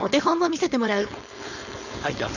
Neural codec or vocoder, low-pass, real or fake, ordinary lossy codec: codec, 16 kHz, 4.8 kbps, FACodec; 7.2 kHz; fake; none